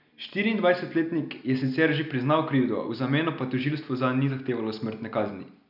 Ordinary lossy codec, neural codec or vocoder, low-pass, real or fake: none; none; 5.4 kHz; real